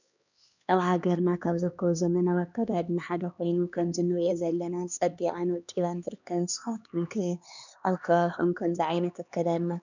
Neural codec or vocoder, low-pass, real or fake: codec, 16 kHz, 2 kbps, X-Codec, HuBERT features, trained on LibriSpeech; 7.2 kHz; fake